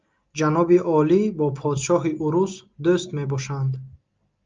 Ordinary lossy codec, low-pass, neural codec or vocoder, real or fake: Opus, 24 kbps; 7.2 kHz; none; real